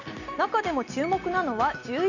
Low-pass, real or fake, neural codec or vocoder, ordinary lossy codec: 7.2 kHz; real; none; none